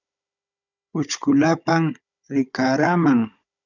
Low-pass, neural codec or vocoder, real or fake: 7.2 kHz; codec, 16 kHz, 16 kbps, FunCodec, trained on Chinese and English, 50 frames a second; fake